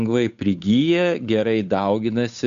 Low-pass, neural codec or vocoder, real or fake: 7.2 kHz; codec, 16 kHz, 8 kbps, FunCodec, trained on Chinese and English, 25 frames a second; fake